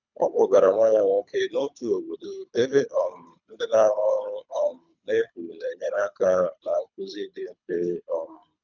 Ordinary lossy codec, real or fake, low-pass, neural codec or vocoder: none; fake; 7.2 kHz; codec, 24 kHz, 3 kbps, HILCodec